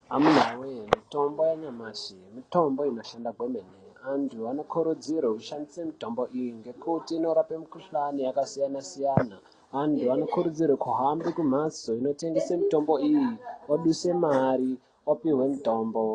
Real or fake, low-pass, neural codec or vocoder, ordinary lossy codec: real; 9.9 kHz; none; AAC, 32 kbps